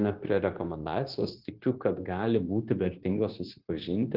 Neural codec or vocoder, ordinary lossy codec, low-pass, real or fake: codec, 16 kHz, 0.9 kbps, LongCat-Audio-Codec; Opus, 16 kbps; 5.4 kHz; fake